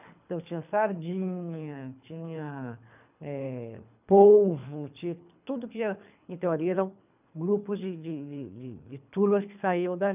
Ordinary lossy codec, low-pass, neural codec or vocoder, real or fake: none; 3.6 kHz; codec, 24 kHz, 3 kbps, HILCodec; fake